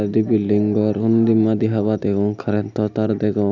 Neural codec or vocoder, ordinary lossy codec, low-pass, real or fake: none; none; 7.2 kHz; real